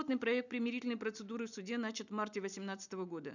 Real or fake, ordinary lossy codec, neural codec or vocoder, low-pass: real; none; none; 7.2 kHz